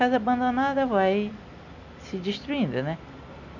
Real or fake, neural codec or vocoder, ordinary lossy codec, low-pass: real; none; none; 7.2 kHz